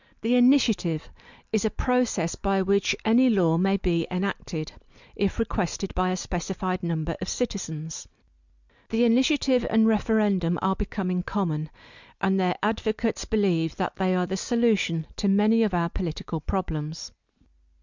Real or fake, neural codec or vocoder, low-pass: real; none; 7.2 kHz